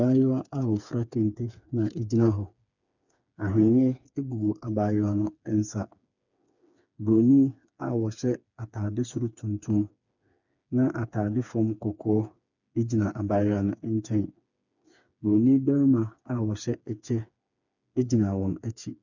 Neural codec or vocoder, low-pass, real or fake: codec, 16 kHz, 4 kbps, FreqCodec, smaller model; 7.2 kHz; fake